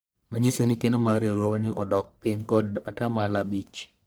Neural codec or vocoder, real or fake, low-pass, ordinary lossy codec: codec, 44.1 kHz, 1.7 kbps, Pupu-Codec; fake; none; none